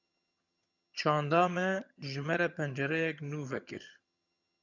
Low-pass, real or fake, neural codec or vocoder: 7.2 kHz; fake; vocoder, 22.05 kHz, 80 mel bands, HiFi-GAN